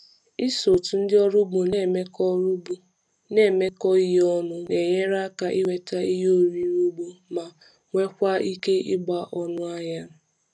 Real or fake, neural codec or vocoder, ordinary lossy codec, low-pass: real; none; none; none